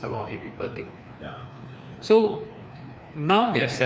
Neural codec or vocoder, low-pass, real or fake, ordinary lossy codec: codec, 16 kHz, 2 kbps, FreqCodec, larger model; none; fake; none